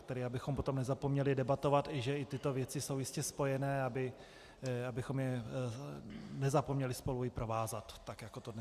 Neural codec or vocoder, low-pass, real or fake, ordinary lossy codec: none; 14.4 kHz; real; Opus, 64 kbps